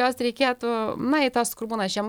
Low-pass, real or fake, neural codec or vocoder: 19.8 kHz; real; none